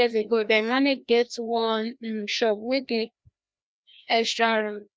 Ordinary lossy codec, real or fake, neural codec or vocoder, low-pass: none; fake; codec, 16 kHz, 1 kbps, FreqCodec, larger model; none